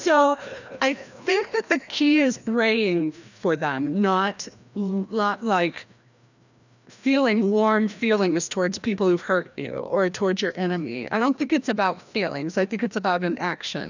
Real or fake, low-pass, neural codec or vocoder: fake; 7.2 kHz; codec, 16 kHz, 1 kbps, FreqCodec, larger model